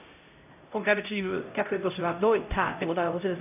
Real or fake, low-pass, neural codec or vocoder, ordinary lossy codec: fake; 3.6 kHz; codec, 16 kHz, 0.5 kbps, X-Codec, HuBERT features, trained on LibriSpeech; none